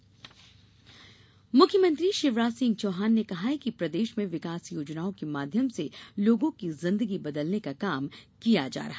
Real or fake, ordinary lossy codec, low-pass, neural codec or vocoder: real; none; none; none